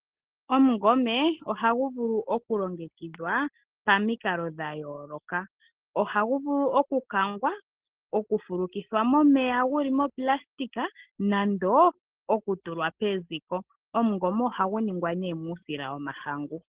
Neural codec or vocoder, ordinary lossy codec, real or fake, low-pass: none; Opus, 16 kbps; real; 3.6 kHz